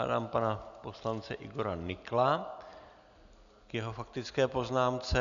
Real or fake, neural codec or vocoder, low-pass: real; none; 7.2 kHz